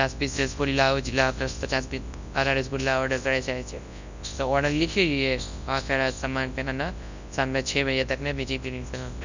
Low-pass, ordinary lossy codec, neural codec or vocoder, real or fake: 7.2 kHz; none; codec, 24 kHz, 0.9 kbps, WavTokenizer, large speech release; fake